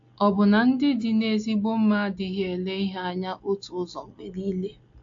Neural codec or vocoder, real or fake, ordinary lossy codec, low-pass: none; real; AAC, 48 kbps; 7.2 kHz